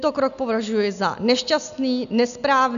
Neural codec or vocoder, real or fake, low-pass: none; real; 7.2 kHz